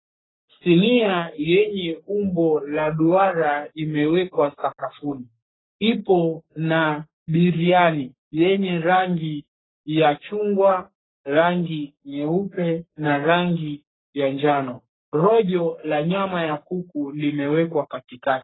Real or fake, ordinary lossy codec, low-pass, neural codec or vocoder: fake; AAC, 16 kbps; 7.2 kHz; codec, 44.1 kHz, 3.4 kbps, Pupu-Codec